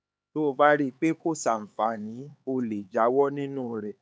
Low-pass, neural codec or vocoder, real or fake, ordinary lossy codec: none; codec, 16 kHz, 4 kbps, X-Codec, HuBERT features, trained on LibriSpeech; fake; none